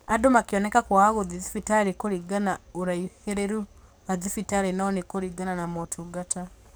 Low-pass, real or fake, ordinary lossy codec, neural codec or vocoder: none; fake; none; codec, 44.1 kHz, 7.8 kbps, DAC